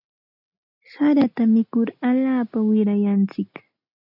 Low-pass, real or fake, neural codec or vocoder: 5.4 kHz; real; none